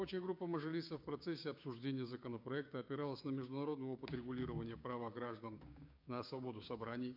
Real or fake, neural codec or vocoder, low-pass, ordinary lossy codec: fake; codec, 16 kHz, 6 kbps, DAC; 5.4 kHz; MP3, 48 kbps